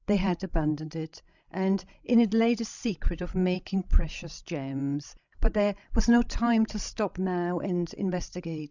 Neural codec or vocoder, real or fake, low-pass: codec, 16 kHz, 16 kbps, FreqCodec, larger model; fake; 7.2 kHz